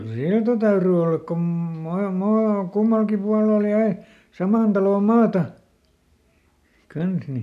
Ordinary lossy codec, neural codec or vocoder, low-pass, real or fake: none; none; 14.4 kHz; real